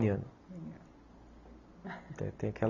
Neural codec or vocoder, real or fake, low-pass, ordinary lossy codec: none; real; 7.2 kHz; none